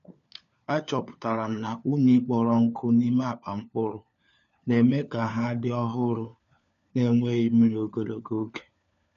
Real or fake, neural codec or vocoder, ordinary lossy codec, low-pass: fake; codec, 16 kHz, 4 kbps, FunCodec, trained on LibriTTS, 50 frames a second; none; 7.2 kHz